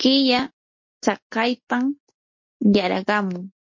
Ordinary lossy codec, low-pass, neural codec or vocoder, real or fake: MP3, 32 kbps; 7.2 kHz; none; real